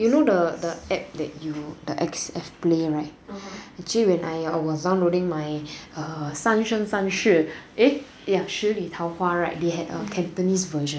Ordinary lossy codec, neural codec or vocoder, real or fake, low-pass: none; none; real; none